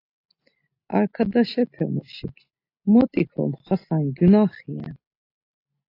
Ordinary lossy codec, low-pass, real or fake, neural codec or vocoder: AAC, 32 kbps; 5.4 kHz; real; none